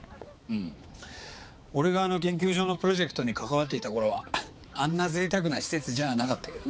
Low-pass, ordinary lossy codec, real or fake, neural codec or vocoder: none; none; fake; codec, 16 kHz, 4 kbps, X-Codec, HuBERT features, trained on balanced general audio